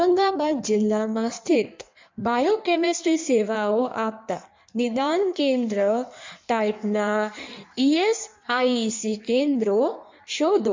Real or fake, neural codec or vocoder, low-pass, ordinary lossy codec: fake; codec, 16 kHz in and 24 kHz out, 1.1 kbps, FireRedTTS-2 codec; 7.2 kHz; MP3, 64 kbps